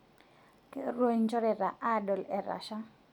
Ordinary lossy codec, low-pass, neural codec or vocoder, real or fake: none; 19.8 kHz; none; real